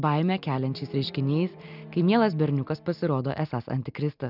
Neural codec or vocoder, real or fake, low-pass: none; real; 5.4 kHz